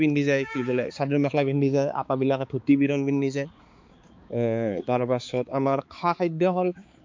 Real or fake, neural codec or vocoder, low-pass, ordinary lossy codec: fake; codec, 16 kHz, 4 kbps, X-Codec, HuBERT features, trained on balanced general audio; 7.2 kHz; MP3, 48 kbps